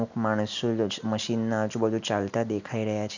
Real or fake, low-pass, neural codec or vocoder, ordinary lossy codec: real; 7.2 kHz; none; none